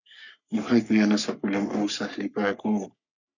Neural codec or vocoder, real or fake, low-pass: codec, 44.1 kHz, 3.4 kbps, Pupu-Codec; fake; 7.2 kHz